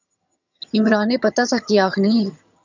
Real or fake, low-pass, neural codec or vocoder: fake; 7.2 kHz; vocoder, 22.05 kHz, 80 mel bands, HiFi-GAN